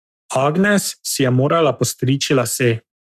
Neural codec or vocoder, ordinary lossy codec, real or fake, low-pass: codec, 44.1 kHz, 7.8 kbps, Pupu-Codec; none; fake; 14.4 kHz